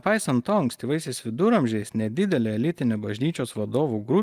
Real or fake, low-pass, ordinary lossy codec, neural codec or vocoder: real; 14.4 kHz; Opus, 32 kbps; none